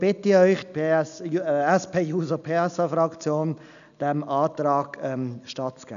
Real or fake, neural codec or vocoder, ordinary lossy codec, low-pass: real; none; none; 7.2 kHz